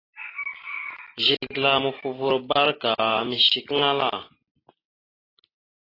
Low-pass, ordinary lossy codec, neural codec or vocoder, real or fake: 5.4 kHz; AAC, 24 kbps; none; real